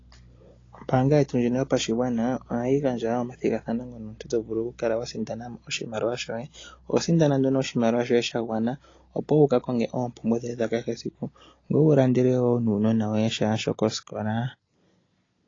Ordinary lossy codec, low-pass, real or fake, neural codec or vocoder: AAC, 32 kbps; 7.2 kHz; real; none